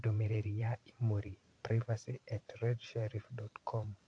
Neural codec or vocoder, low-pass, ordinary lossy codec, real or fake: vocoder, 44.1 kHz, 128 mel bands every 512 samples, BigVGAN v2; 9.9 kHz; AAC, 64 kbps; fake